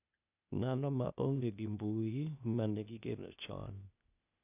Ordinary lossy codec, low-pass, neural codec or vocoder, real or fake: none; 3.6 kHz; codec, 16 kHz, 0.8 kbps, ZipCodec; fake